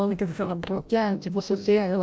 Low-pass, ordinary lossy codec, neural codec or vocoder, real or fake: none; none; codec, 16 kHz, 0.5 kbps, FreqCodec, larger model; fake